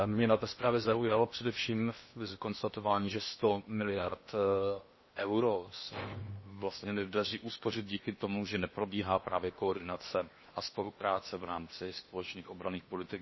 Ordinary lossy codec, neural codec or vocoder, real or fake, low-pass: MP3, 24 kbps; codec, 16 kHz in and 24 kHz out, 0.8 kbps, FocalCodec, streaming, 65536 codes; fake; 7.2 kHz